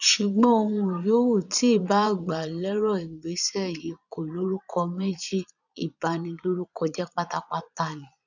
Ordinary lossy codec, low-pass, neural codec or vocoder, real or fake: none; 7.2 kHz; vocoder, 22.05 kHz, 80 mel bands, Vocos; fake